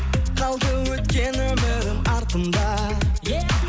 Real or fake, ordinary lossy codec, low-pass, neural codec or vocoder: real; none; none; none